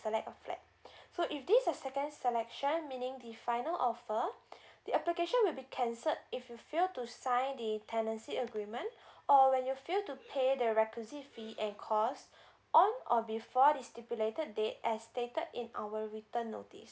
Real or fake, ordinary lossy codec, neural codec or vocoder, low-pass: real; none; none; none